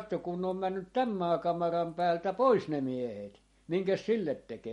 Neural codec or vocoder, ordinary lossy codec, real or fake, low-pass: none; MP3, 48 kbps; real; 14.4 kHz